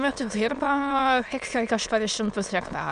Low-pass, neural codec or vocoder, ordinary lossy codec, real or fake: 9.9 kHz; autoencoder, 22.05 kHz, a latent of 192 numbers a frame, VITS, trained on many speakers; Opus, 64 kbps; fake